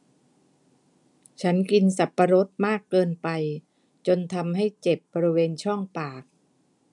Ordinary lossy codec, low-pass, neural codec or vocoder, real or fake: none; 10.8 kHz; none; real